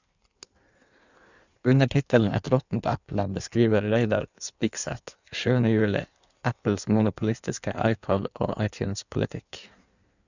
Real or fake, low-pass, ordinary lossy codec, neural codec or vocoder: fake; 7.2 kHz; none; codec, 16 kHz in and 24 kHz out, 1.1 kbps, FireRedTTS-2 codec